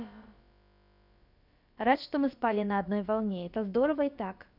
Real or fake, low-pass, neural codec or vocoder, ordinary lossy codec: fake; 5.4 kHz; codec, 16 kHz, about 1 kbps, DyCAST, with the encoder's durations; none